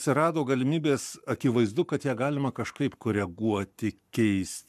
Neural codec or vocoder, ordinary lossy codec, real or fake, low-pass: codec, 44.1 kHz, 7.8 kbps, Pupu-Codec; MP3, 96 kbps; fake; 14.4 kHz